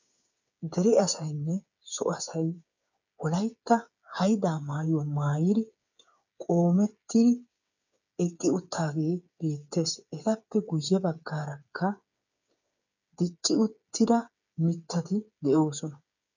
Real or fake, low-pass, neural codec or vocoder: fake; 7.2 kHz; codec, 16 kHz, 8 kbps, FreqCodec, smaller model